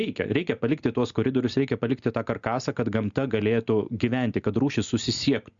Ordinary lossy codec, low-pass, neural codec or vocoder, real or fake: Opus, 64 kbps; 7.2 kHz; none; real